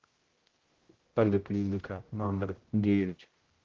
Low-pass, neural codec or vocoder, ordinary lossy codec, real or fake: 7.2 kHz; codec, 16 kHz, 0.5 kbps, X-Codec, HuBERT features, trained on general audio; Opus, 16 kbps; fake